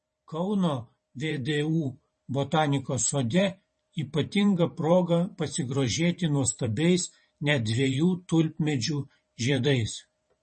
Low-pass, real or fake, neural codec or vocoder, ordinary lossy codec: 10.8 kHz; fake; vocoder, 44.1 kHz, 128 mel bands every 512 samples, BigVGAN v2; MP3, 32 kbps